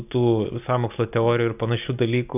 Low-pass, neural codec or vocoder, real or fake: 3.6 kHz; none; real